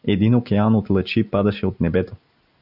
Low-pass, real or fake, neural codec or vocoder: 5.4 kHz; real; none